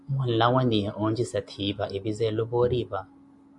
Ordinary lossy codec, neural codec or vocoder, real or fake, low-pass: AAC, 64 kbps; none; real; 10.8 kHz